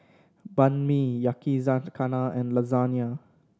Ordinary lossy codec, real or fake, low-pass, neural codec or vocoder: none; real; none; none